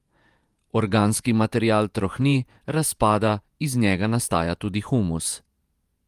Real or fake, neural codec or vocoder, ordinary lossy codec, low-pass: fake; vocoder, 48 kHz, 128 mel bands, Vocos; Opus, 32 kbps; 14.4 kHz